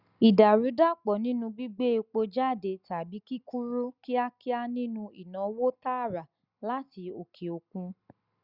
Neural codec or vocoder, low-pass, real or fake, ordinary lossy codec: none; 5.4 kHz; real; Opus, 64 kbps